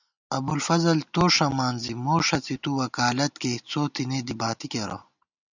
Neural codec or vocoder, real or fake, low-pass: none; real; 7.2 kHz